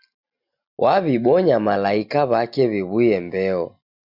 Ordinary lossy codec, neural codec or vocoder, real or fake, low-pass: Opus, 64 kbps; none; real; 5.4 kHz